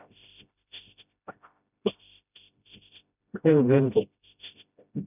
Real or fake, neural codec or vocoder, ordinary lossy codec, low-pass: fake; codec, 16 kHz, 0.5 kbps, FreqCodec, smaller model; none; 3.6 kHz